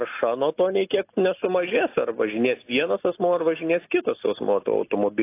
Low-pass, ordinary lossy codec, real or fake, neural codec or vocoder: 3.6 kHz; AAC, 24 kbps; real; none